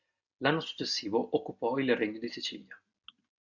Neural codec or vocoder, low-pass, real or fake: none; 7.2 kHz; real